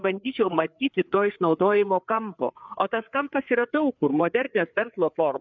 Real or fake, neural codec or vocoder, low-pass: fake; codec, 16 kHz, 4 kbps, FreqCodec, larger model; 7.2 kHz